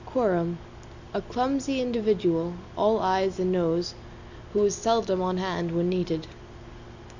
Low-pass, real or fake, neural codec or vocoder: 7.2 kHz; real; none